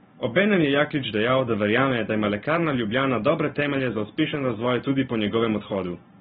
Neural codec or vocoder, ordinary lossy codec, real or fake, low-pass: none; AAC, 16 kbps; real; 10.8 kHz